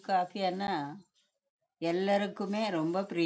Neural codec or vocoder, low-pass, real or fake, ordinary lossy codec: none; none; real; none